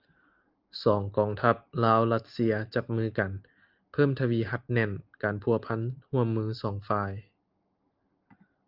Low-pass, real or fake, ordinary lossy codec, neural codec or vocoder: 5.4 kHz; real; Opus, 24 kbps; none